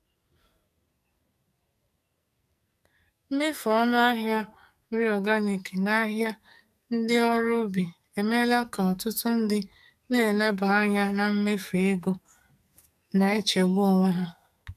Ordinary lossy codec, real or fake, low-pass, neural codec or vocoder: none; fake; 14.4 kHz; codec, 44.1 kHz, 2.6 kbps, SNAC